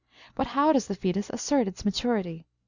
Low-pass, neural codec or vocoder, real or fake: 7.2 kHz; none; real